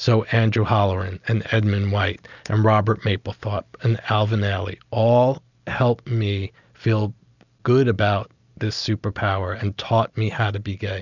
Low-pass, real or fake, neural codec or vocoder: 7.2 kHz; real; none